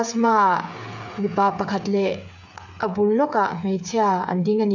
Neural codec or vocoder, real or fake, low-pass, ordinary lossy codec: codec, 16 kHz, 8 kbps, FreqCodec, smaller model; fake; 7.2 kHz; none